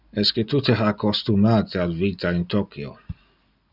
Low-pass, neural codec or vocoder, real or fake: 5.4 kHz; none; real